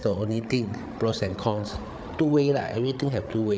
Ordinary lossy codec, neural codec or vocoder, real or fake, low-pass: none; codec, 16 kHz, 8 kbps, FreqCodec, larger model; fake; none